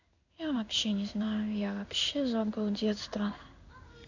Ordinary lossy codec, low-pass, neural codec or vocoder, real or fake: none; 7.2 kHz; codec, 16 kHz in and 24 kHz out, 1 kbps, XY-Tokenizer; fake